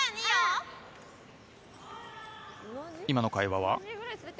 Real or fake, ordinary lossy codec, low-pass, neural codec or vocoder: real; none; none; none